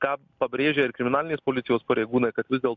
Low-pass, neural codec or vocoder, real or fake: 7.2 kHz; none; real